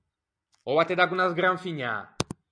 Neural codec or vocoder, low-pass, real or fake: none; 9.9 kHz; real